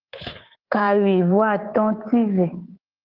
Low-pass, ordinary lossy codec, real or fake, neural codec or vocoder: 5.4 kHz; Opus, 16 kbps; fake; codec, 24 kHz, 3.1 kbps, DualCodec